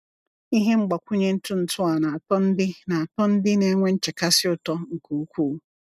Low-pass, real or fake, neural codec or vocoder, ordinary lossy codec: 14.4 kHz; real; none; none